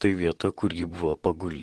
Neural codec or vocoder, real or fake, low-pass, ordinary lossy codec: vocoder, 44.1 kHz, 128 mel bands, Pupu-Vocoder; fake; 10.8 kHz; Opus, 16 kbps